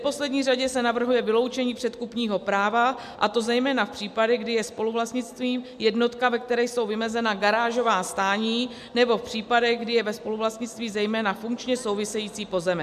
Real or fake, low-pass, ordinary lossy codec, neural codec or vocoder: real; 14.4 kHz; MP3, 96 kbps; none